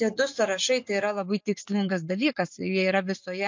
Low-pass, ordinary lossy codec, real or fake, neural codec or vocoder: 7.2 kHz; MP3, 48 kbps; real; none